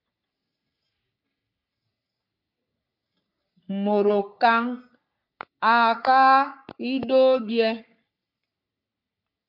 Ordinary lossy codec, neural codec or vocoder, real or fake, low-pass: MP3, 48 kbps; codec, 44.1 kHz, 3.4 kbps, Pupu-Codec; fake; 5.4 kHz